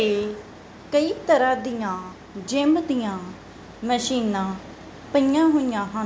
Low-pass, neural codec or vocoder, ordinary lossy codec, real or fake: none; none; none; real